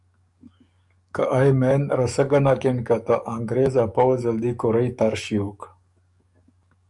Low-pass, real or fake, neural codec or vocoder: 10.8 kHz; fake; codec, 44.1 kHz, 7.8 kbps, DAC